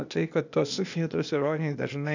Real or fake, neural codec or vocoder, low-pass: fake; codec, 16 kHz, 0.8 kbps, ZipCodec; 7.2 kHz